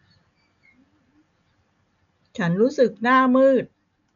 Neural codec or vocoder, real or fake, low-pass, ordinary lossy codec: none; real; 7.2 kHz; none